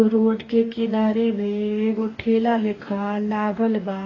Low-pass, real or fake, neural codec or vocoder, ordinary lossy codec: 7.2 kHz; fake; codec, 44.1 kHz, 2.6 kbps, DAC; AAC, 32 kbps